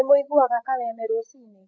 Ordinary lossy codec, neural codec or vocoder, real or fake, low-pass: none; codec, 16 kHz, 16 kbps, FreqCodec, larger model; fake; none